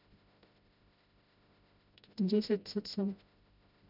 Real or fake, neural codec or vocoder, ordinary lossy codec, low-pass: fake; codec, 16 kHz, 0.5 kbps, FreqCodec, smaller model; none; 5.4 kHz